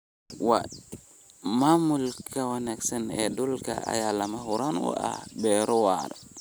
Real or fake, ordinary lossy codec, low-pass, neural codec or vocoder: real; none; none; none